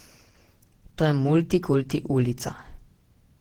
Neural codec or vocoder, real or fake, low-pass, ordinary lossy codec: vocoder, 48 kHz, 128 mel bands, Vocos; fake; 19.8 kHz; Opus, 16 kbps